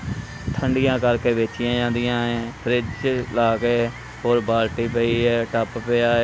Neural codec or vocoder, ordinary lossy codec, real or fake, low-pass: none; none; real; none